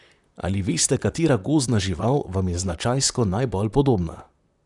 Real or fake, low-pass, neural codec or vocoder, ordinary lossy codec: fake; 10.8 kHz; vocoder, 44.1 kHz, 128 mel bands, Pupu-Vocoder; none